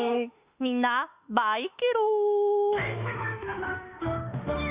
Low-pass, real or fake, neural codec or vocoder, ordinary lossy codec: 3.6 kHz; fake; autoencoder, 48 kHz, 32 numbers a frame, DAC-VAE, trained on Japanese speech; Opus, 64 kbps